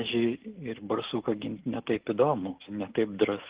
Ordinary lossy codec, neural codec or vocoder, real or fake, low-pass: Opus, 16 kbps; none; real; 3.6 kHz